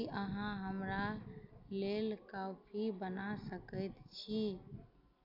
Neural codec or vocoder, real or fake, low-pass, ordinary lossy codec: none; real; 5.4 kHz; none